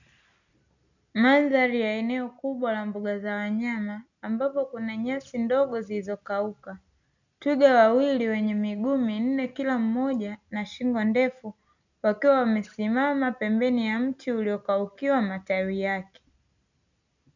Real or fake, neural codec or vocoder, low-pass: real; none; 7.2 kHz